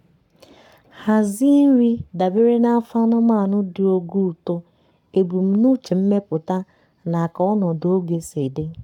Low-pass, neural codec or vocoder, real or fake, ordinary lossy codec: 19.8 kHz; codec, 44.1 kHz, 7.8 kbps, Pupu-Codec; fake; none